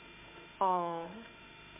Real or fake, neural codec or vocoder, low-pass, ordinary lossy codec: fake; autoencoder, 48 kHz, 32 numbers a frame, DAC-VAE, trained on Japanese speech; 3.6 kHz; MP3, 32 kbps